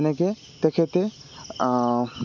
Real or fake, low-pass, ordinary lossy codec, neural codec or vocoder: real; 7.2 kHz; none; none